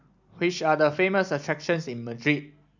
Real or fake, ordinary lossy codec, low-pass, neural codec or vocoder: real; none; 7.2 kHz; none